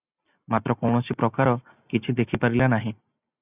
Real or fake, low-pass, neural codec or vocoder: real; 3.6 kHz; none